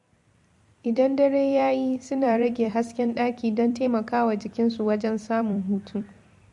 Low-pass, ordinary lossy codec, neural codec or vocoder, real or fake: 10.8 kHz; MP3, 48 kbps; vocoder, 44.1 kHz, 128 mel bands every 256 samples, BigVGAN v2; fake